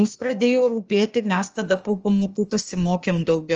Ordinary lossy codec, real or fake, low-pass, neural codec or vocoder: Opus, 24 kbps; fake; 7.2 kHz; codec, 16 kHz, 0.8 kbps, ZipCodec